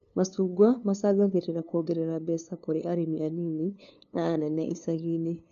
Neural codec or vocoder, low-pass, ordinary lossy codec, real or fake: codec, 16 kHz, 2 kbps, FunCodec, trained on LibriTTS, 25 frames a second; 7.2 kHz; AAC, 64 kbps; fake